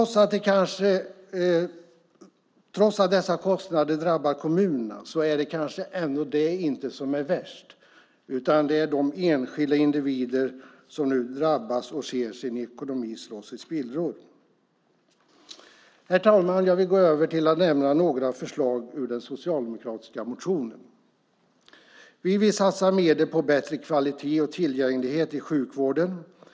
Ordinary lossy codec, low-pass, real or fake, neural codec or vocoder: none; none; real; none